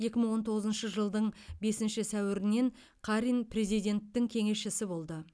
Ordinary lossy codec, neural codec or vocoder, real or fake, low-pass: none; none; real; none